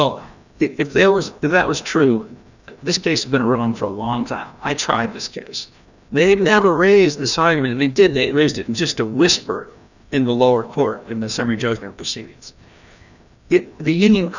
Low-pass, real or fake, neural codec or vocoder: 7.2 kHz; fake; codec, 16 kHz, 1 kbps, FreqCodec, larger model